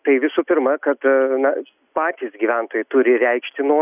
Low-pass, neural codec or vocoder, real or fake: 3.6 kHz; none; real